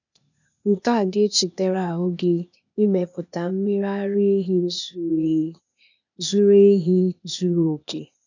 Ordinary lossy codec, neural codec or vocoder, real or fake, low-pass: none; codec, 16 kHz, 0.8 kbps, ZipCodec; fake; 7.2 kHz